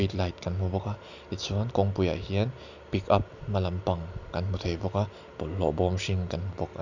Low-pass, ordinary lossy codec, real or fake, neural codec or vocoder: 7.2 kHz; none; real; none